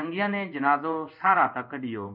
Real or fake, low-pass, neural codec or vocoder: fake; 5.4 kHz; codec, 16 kHz in and 24 kHz out, 1 kbps, XY-Tokenizer